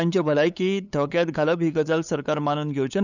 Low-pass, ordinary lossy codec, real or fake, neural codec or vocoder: 7.2 kHz; none; fake; codec, 16 kHz, 8 kbps, FunCodec, trained on LibriTTS, 25 frames a second